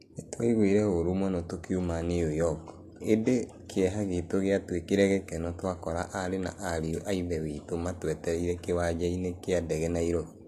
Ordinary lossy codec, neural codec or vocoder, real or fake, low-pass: AAC, 64 kbps; none; real; 14.4 kHz